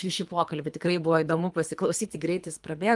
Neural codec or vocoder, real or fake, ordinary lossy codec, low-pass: codec, 24 kHz, 3 kbps, HILCodec; fake; Opus, 32 kbps; 10.8 kHz